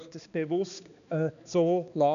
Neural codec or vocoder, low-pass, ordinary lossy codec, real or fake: codec, 16 kHz, 2 kbps, X-Codec, HuBERT features, trained on balanced general audio; 7.2 kHz; none; fake